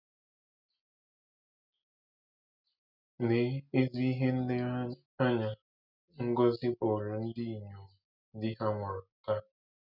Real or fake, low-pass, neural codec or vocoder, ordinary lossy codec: real; 5.4 kHz; none; none